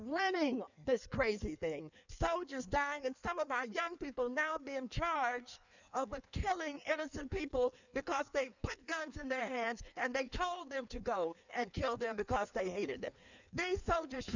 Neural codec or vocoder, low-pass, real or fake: codec, 16 kHz in and 24 kHz out, 1.1 kbps, FireRedTTS-2 codec; 7.2 kHz; fake